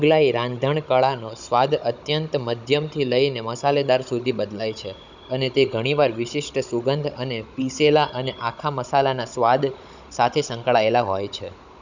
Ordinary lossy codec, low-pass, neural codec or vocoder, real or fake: none; 7.2 kHz; codec, 16 kHz, 16 kbps, FunCodec, trained on Chinese and English, 50 frames a second; fake